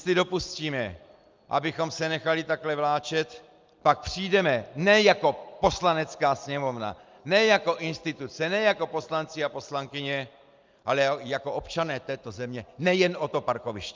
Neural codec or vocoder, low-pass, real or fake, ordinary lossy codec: none; 7.2 kHz; real; Opus, 24 kbps